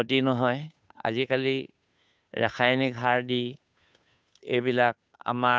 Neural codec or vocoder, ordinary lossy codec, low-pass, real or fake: codec, 16 kHz, 2 kbps, FunCodec, trained on Chinese and English, 25 frames a second; none; none; fake